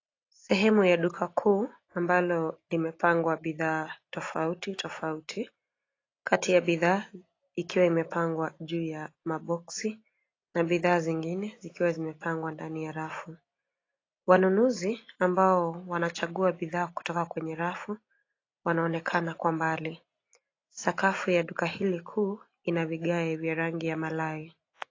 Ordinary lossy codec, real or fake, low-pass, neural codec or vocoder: AAC, 32 kbps; real; 7.2 kHz; none